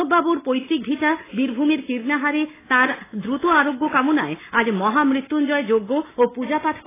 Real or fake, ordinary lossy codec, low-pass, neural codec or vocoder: real; AAC, 16 kbps; 3.6 kHz; none